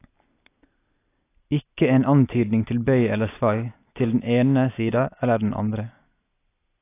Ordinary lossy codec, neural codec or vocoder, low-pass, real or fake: AAC, 24 kbps; none; 3.6 kHz; real